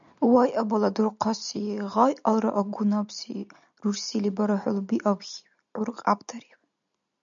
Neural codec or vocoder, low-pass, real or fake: none; 7.2 kHz; real